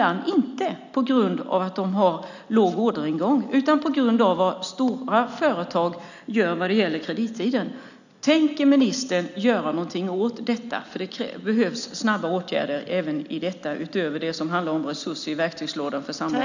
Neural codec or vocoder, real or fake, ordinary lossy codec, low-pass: none; real; none; 7.2 kHz